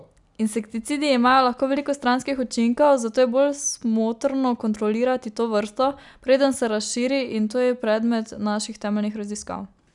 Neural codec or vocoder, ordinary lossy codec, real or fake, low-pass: none; none; real; 10.8 kHz